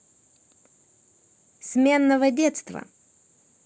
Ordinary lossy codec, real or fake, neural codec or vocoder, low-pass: none; real; none; none